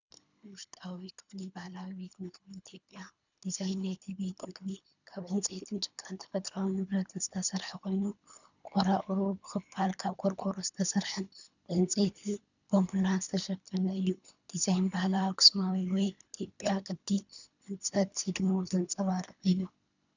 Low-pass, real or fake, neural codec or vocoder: 7.2 kHz; fake; codec, 24 kHz, 3 kbps, HILCodec